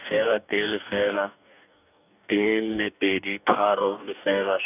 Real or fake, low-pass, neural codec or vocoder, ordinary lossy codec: fake; 3.6 kHz; codec, 44.1 kHz, 2.6 kbps, DAC; none